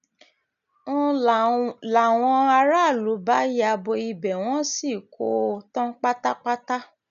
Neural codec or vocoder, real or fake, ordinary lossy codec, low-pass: none; real; none; 7.2 kHz